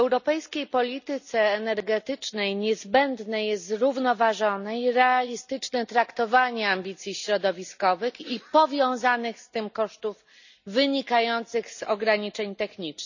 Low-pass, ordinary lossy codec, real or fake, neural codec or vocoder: 7.2 kHz; none; real; none